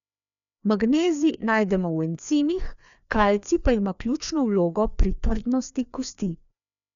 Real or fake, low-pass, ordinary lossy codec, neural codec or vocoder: fake; 7.2 kHz; none; codec, 16 kHz, 2 kbps, FreqCodec, larger model